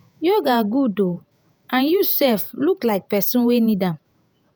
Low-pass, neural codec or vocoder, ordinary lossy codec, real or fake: none; vocoder, 48 kHz, 128 mel bands, Vocos; none; fake